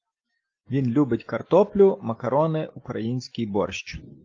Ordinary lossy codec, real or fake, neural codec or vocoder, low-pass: Opus, 24 kbps; real; none; 7.2 kHz